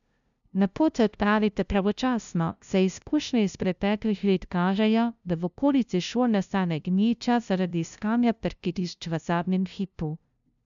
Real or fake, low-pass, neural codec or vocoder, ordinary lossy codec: fake; 7.2 kHz; codec, 16 kHz, 0.5 kbps, FunCodec, trained on LibriTTS, 25 frames a second; none